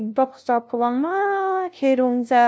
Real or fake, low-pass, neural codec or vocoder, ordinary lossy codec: fake; none; codec, 16 kHz, 0.5 kbps, FunCodec, trained on LibriTTS, 25 frames a second; none